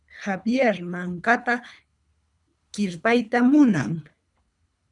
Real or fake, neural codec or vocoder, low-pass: fake; codec, 24 kHz, 3 kbps, HILCodec; 10.8 kHz